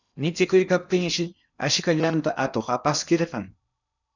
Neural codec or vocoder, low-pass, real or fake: codec, 16 kHz in and 24 kHz out, 0.8 kbps, FocalCodec, streaming, 65536 codes; 7.2 kHz; fake